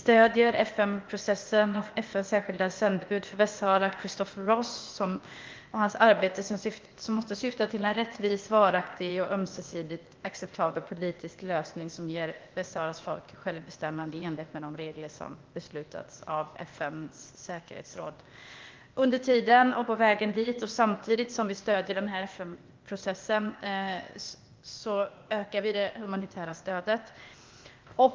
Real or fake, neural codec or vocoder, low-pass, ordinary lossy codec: fake; codec, 16 kHz, 0.8 kbps, ZipCodec; 7.2 kHz; Opus, 24 kbps